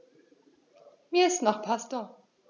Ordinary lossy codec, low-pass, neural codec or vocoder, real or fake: none; 7.2 kHz; none; real